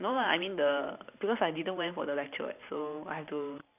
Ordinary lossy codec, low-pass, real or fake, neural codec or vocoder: none; 3.6 kHz; fake; vocoder, 44.1 kHz, 128 mel bands every 512 samples, BigVGAN v2